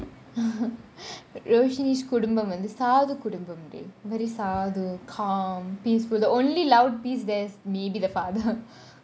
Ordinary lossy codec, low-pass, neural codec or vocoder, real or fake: none; none; none; real